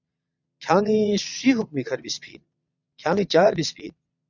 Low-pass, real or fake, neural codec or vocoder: 7.2 kHz; fake; vocoder, 22.05 kHz, 80 mel bands, Vocos